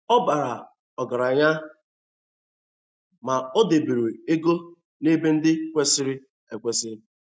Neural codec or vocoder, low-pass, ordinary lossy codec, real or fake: none; none; none; real